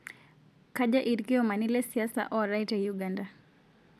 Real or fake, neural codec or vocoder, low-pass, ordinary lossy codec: real; none; 14.4 kHz; none